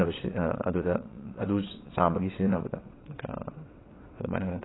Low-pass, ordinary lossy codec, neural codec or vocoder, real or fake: 7.2 kHz; AAC, 16 kbps; vocoder, 22.05 kHz, 80 mel bands, WaveNeXt; fake